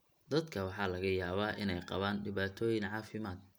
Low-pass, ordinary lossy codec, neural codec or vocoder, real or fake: none; none; none; real